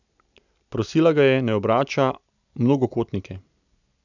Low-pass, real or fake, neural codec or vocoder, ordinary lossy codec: 7.2 kHz; real; none; none